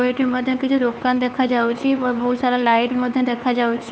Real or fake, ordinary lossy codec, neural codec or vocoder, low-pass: fake; none; codec, 16 kHz, 4 kbps, X-Codec, HuBERT features, trained on LibriSpeech; none